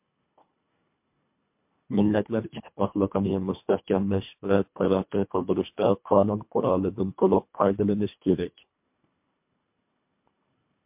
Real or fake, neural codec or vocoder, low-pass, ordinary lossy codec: fake; codec, 24 kHz, 1.5 kbps, HILCodec; 3.6 kHz; MP3, 32 kbps